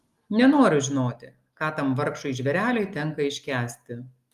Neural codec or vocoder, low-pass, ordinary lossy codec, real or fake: none; 14.4 kHz; Opus, 32 kbps; real